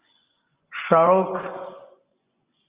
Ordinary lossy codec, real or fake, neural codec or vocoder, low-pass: Opus, 32 kbps; fake; vocoder, 24 kHz, 100 mel bands, Vocos; 3.6 kHz